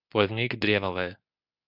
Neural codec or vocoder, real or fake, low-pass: codec, 24 kHz, 0.9 kbps, WavTokenizer, medium speech release version 2; fake; 5.4 kHz